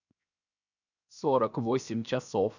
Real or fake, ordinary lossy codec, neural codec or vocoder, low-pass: fake; none; codec, 16 kHz, 0.7 kbps, FocalCodec; 7.2 kHz